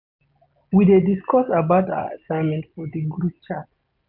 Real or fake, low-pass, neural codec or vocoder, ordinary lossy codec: real; 5.4 kHz; none; none